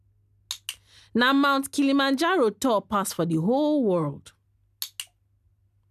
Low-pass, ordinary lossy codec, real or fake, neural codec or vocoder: 14.4 kHz; none; real; none